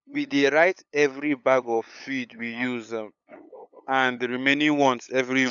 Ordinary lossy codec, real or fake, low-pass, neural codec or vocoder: none; fake; 7.2 kHz; codec, 16 kHz, 8 kbps, FunCodec, trained on LibriTTS, 25 frames a second